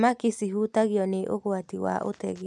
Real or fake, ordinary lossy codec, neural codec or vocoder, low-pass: real; none; none; none